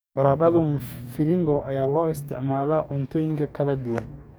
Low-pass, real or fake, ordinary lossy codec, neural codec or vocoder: none; fake; none; codec, 44.1 kHz, 2.6 kbps, DAC